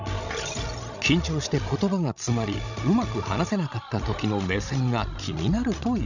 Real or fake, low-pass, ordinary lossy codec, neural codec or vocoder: fake; 7.2 kHz; none; codec, 16 kHz, 16 kbps, FreqCodec, larger model